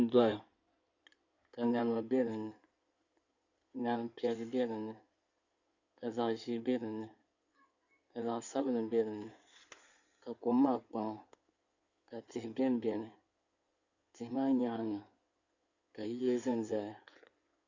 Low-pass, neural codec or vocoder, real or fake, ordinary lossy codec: 7.2 kHz; codec, 16 kHz in and 24 kHz out, 2.2 kbps, FireRedTTS-2 codec; fake; Opus, 64 kbps